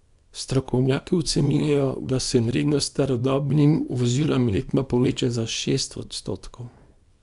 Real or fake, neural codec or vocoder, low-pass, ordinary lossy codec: fake; codec, 24 kHz, 0.9 kbps, WavTokenizer, small release; 10.8 kHz; none